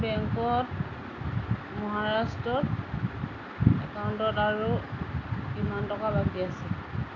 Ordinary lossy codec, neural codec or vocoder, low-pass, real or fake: none; none; 7.2 kHz; real